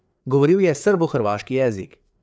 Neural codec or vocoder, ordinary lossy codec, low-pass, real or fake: codec, 16 kHz, 4 kbps, FreqCodec, larger model; none; none; fake